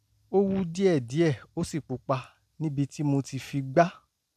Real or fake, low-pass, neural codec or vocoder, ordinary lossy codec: real; 14.4 kHz; none; none